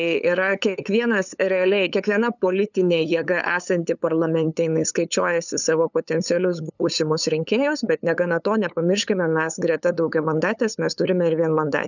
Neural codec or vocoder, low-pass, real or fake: codec, 16 kHz, 8 kbps, FunCodec, trained on LibriTTS, 25 frames a second; 7.2 kHz; fake